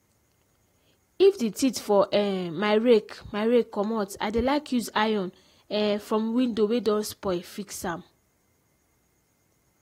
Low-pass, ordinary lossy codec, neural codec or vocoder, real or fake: 19.8 kHz; AAC, 48 kbps; none; real